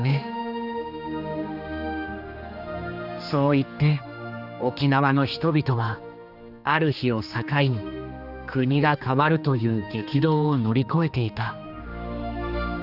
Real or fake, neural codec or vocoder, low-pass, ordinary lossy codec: fake; codec, 16 kHz, 2 kbps, X-Codec, HuBERT features, trained on general audio; 5.4 kHz; none